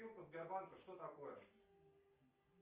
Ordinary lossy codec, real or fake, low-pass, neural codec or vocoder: Opus, 24 kbps; real; 3.6 kHz; none